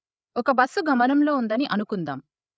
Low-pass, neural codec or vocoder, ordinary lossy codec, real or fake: none; codec, 16 kHz, 8 kbps, FreqCodec, larger model; none; fake